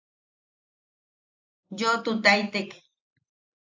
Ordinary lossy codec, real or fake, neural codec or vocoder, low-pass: AAC, 48 kbps; real; none; 7.2 kHz